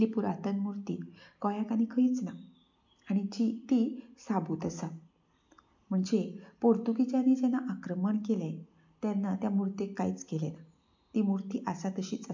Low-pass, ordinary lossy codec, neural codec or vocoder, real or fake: 7.2 kHz; MP3, 48 kbps; none; real